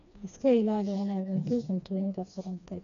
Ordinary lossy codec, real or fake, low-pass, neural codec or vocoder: none; fake; 7.2 kHz; codec, 16 kHz, 2 kbps, FreqCodec, smaller model